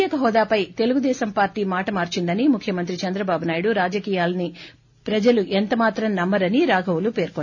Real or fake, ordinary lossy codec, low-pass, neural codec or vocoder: real; none; 7.2 kHz; none